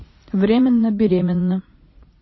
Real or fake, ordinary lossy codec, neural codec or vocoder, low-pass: fake; MP3, 24 kbps; vocoder, 44.1 kHz, 80 mel bands, Vocos; 7.2 kHz